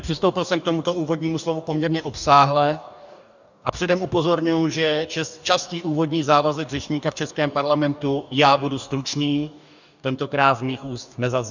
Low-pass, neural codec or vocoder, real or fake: 7.2 kHz; codec, 44.1 kHz, 2.6 kbps, DAC; fake